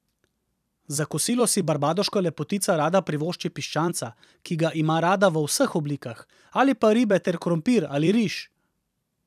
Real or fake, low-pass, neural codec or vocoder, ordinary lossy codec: fake; 14.4 kHz; vocoder, 44.1 kHz, 128 mel bands every 256 samples, BigVGAN v2; none